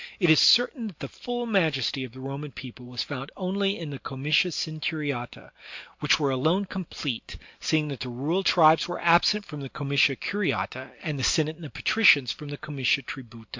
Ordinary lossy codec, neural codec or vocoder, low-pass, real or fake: MP3, 48 kbps; none; 7.2 kHz; real